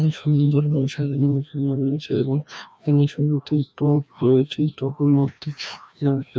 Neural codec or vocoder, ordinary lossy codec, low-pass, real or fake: codec, 16 kHz, 1 kbps, FreqCodec, larger model; none; none; fake